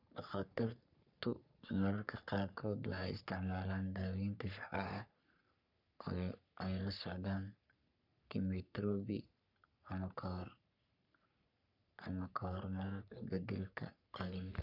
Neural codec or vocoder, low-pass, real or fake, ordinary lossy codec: codec, 44.1 kHz, 3.4 kbps, Pupu-Codec; 5.4 kHz; fake; none